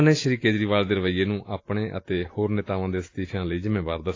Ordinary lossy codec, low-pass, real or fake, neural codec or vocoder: AAC, 32 kbps; 7.2 kHz; real; none